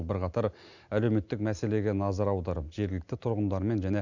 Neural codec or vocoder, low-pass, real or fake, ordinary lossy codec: none; 7.2 kHz; real; none